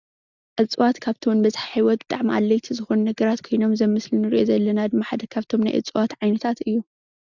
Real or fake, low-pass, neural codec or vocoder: real; 7.2 kHz; none